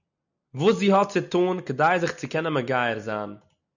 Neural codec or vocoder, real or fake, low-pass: none; real; 7.2 kHz